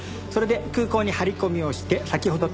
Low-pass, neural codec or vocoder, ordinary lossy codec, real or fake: none; none; none; real